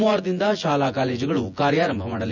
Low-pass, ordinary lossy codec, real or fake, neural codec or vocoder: 7.2 kHz; none; fake; vocoder, 24 kHz, 100 mel bands, Vocos